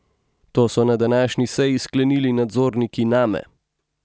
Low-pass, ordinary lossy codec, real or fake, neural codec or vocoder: none; none; real; none